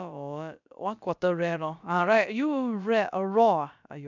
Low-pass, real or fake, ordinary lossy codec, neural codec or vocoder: 7.2 kHz; fake; none; codec, 16 kHz, about 1 kbps, DyCAST, with the encoder's durations